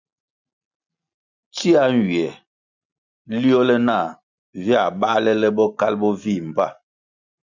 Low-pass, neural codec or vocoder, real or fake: 7.2 kHz; none; real